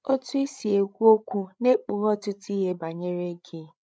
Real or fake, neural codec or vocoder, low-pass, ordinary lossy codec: fake; codec, 16 kHz, 16 kbps, FreqCodec, larger model; none; none